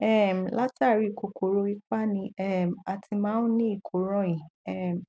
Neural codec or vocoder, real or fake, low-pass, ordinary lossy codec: none; real; none; none